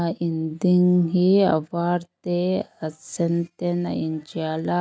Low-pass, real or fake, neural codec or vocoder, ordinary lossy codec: none; real; none; none